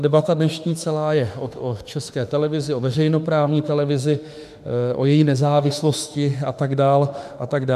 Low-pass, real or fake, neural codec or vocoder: 14.4 kHz; fake; autoencoder, 48 kHz, 32 numbers a frame, DAC-VAE, trained on Japanese speech